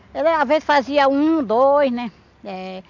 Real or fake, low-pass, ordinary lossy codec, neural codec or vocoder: real; 7.2 kHz; none; none